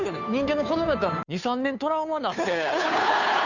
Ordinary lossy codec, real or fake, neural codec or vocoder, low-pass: none; fake; codec, 16 kHz, 2 kbps, FunCodec, trained on Chinese and English, 25 frames a second; 7.2 kHz